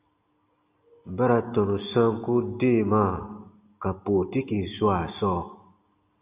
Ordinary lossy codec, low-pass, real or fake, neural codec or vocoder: Opus, 64 kbps; 3.6 kHz; real; none